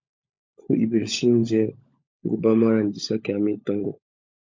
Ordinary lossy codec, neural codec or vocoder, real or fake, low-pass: MP3, 48 kbps; codec, 16 kHz, 16 kbps, FunCodec, trained on LibriTTS, 50 frames a second; fake; 7.2 kHz